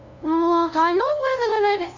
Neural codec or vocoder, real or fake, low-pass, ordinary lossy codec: codec, 16 kHz, 0.5 kbps, FunCodec, trained on LibriTTS, 25 frames a second; fake; 7.2 kHz; none